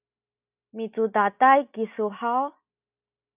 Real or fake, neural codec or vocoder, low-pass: real; none; 3.6 kHz